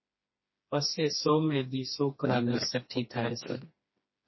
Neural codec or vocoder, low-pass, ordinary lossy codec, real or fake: codec, 16 kHz, 2 kbps, FreqCodec, smaller model; 7.2 kHz; MP3, 24 kbps; fake